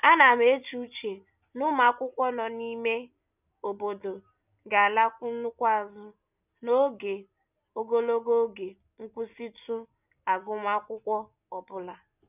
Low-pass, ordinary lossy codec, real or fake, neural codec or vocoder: 3.6 kHz; none; real; none